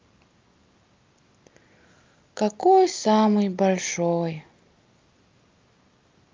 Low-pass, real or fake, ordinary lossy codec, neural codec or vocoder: 7.2 kHz; real; Opus, 24 kbps; none